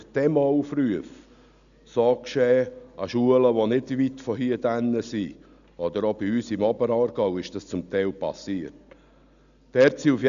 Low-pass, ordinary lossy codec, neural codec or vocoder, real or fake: 7.2 kHz; none; none; real